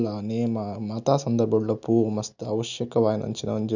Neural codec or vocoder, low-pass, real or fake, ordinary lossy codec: none; 7.2 kHz; real; none